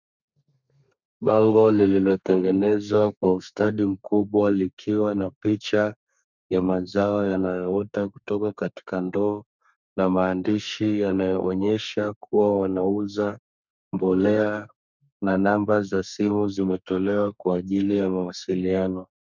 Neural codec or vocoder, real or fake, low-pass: codec, 32 kHz, 1.9 kbps, SNAC; fake; 7.2 kHz